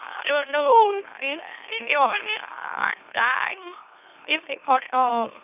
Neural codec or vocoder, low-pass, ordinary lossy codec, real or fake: autoencoder, 44.1 kHz, a latent of 192 numbers a frame, MeloTTS; 3.6 kHz; none; fake